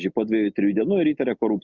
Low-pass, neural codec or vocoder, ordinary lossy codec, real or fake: 7.2 kHz; none; Opus, 64 kbps; real